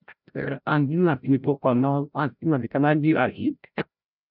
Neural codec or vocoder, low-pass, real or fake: codec, 16 kHz, 0.5 kbps, FreqCodec, larger model; 5.4 kHz; fake